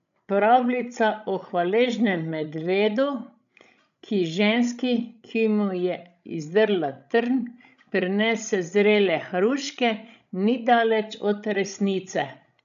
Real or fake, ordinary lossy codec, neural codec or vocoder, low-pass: fake; none; codec, 16 kHz, 16 kbps, FreqCodec, larger model; 7.2 kHz